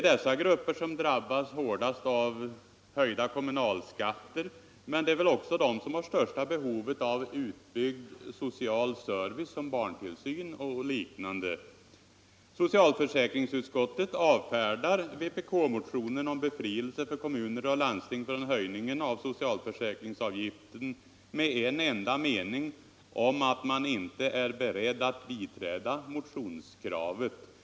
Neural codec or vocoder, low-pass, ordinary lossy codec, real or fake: none; none; none; real